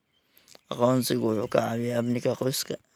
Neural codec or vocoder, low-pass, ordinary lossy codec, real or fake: none; none; none; real